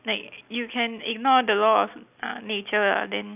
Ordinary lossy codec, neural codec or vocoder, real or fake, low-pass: none; none; real; 3.6 kHz